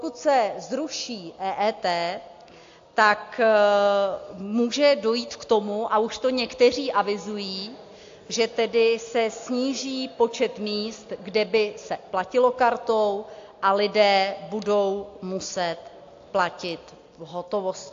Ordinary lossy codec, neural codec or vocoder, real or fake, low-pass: AAC, 48 kbps; none; real; 7.2 kHz